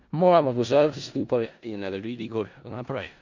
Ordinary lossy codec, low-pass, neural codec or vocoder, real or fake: MP3, 64 kbps; 7.2 kHz; codec, 16 kHz in and 24 kHz out, 0.4 kbps, LongCat-Audio-Codec, four codebook decoder; fake